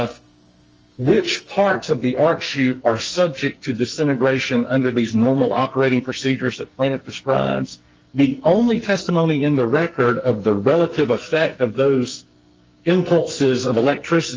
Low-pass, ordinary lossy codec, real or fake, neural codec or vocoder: 7.2 kHz; Opus, 24 kbps; fake; codec, 44.1 kHz, 2.6 kbps, SNAC